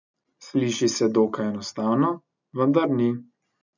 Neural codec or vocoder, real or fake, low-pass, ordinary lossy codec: none; real; 7.2 kHz; none